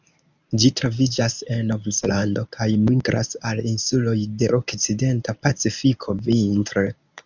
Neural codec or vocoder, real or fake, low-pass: codec, 24 kHz, 0.9 kbps, WavTokenizer, medium speech release version 1; fake; 7.2 kHz